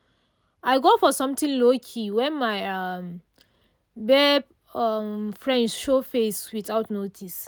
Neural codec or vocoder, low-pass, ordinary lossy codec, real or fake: none; none; none; real